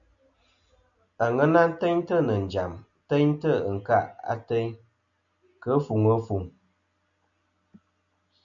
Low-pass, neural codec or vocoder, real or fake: 7.2 kHz; none; real